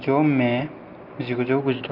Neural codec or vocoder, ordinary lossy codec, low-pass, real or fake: none; Opus, 24 kbps; 5.4 kHz; real